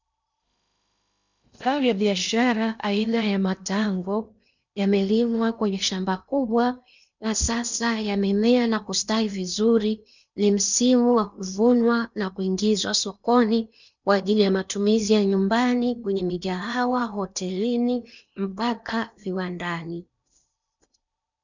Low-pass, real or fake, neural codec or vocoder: 7.2 kHz; fake; codec, 16 kHz in and 24 kHz out, 0.8 kbps, FocalCodec, streaming, 65536 codes